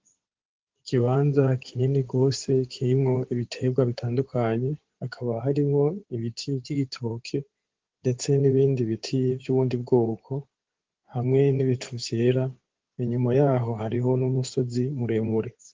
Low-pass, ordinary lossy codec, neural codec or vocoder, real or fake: 7.2 kHz; Opus, 16 kbps; codec, 16 kHz in and 24 kHz out, 2.2 kbps, FireRedTTS-2 codec; fake